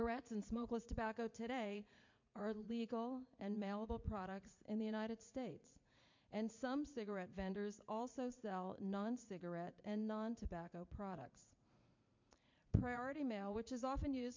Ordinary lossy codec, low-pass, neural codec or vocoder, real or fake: MP3, 64 kbps; 7.2 kHz; vocoder, 44.1 kHz, 80 mel bands, Vocos; fake